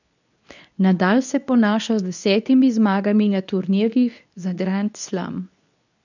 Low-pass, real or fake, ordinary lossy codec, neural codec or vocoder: 7.2 kHz; fake; none; codec, 24 kHz, 0.9 kbps, WavTokenizer, medium speech release version 2